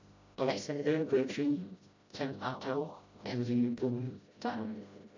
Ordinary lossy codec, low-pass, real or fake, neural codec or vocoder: MP3, 64 kbps; 7.2 kHz; fake; codec, 16 kHz, 0.5 kbps, FreqCodec, smaller model